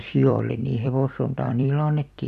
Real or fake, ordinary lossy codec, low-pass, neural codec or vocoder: fake; none; 14.4 kHz; vocoder, 44.1 kHz, 128 mel bands every 256 samples, BigVGAN v2